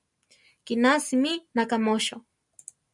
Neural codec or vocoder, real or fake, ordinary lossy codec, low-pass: none; real; MP3, 96 kbps; 10.8 kHz